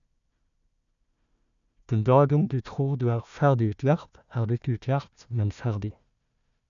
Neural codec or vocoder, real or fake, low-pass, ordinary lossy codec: codec, 16 kHz, 1 kbps, FunCodec, trained on Chinese and English, 50 frames a second; fake; 7.2 kHz; none